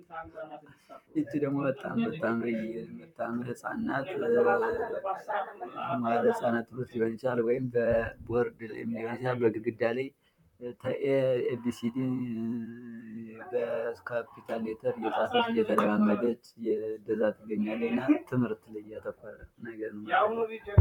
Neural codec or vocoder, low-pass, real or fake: vocoder, 44.1 kHz, 128 mel bands, Pupu-Vocoder; 19.8 kHz; fake